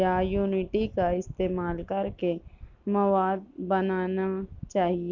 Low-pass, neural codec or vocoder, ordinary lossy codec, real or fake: 7.2 kHz; none; none; real